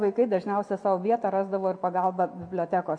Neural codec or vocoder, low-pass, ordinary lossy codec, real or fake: none; 9.9 kHz; MP3, 48 kbps; real